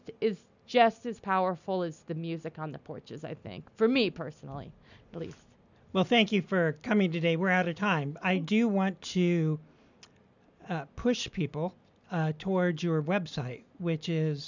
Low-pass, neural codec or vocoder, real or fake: 7.2 kHz; none; real